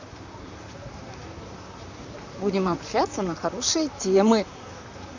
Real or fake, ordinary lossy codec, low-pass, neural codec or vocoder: fake; Opus, 64 kbps; 7.2 kHz; vocoder, 44.1 kHz, 128 mel bands, Pupu-Vocoder